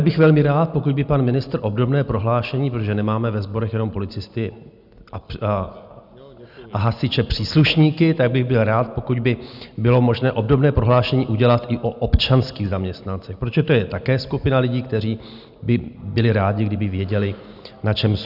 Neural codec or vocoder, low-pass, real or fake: none; 5.4 kHz; real